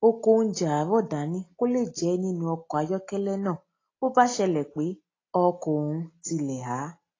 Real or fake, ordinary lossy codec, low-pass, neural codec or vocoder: real; AAC, 32 kbps; 7.2 kHz; none